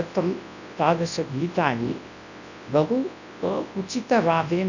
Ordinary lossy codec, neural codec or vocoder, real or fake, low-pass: none; codec, 24 kHz, 0.9 kbps, WavTokenizer, large speech release; fake; 7.2 kHz